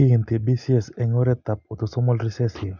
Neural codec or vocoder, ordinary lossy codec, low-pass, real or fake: none; none; 7.2 kHz; real